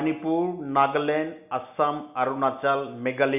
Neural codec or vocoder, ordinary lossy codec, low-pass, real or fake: none; none; 3.6 kHz; real